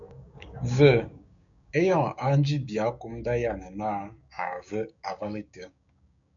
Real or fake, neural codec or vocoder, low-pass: fake; codec, 16 kHz, 6 kbps, DAC; 7.2 kHz